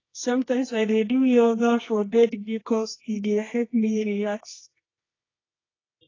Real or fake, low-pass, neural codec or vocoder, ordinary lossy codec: fake; 7.2 kHz; codec, 24 kHz, 0.9 kbps, WavTokenizer, medium music audio release; AAC, 32 kbps